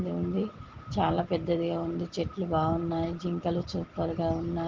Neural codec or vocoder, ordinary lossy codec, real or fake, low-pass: none; Opus, 16 kbps; real; 7.2 kHz